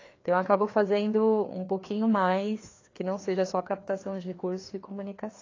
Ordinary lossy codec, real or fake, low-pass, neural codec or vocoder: AAC, 32 kbps; fake; 7.2 kHz; codec, 16 kHz, 2 kbps, FreqCodec, larger model